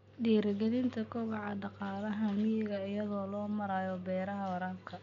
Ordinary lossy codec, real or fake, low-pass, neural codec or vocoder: none; real; 7.2 kHz; none